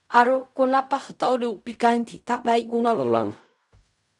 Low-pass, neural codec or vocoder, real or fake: 10.8 kHz; codec, 16 kHz in and 24 kHz out, 0.4 kbps, LongCat-Audio-Codec, fine tuned four codebook decoder; fake